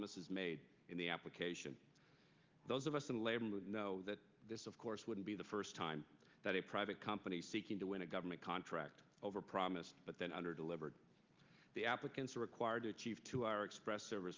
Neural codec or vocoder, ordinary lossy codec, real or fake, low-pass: none; Opus, 32 kbps; real; 7.2 kHz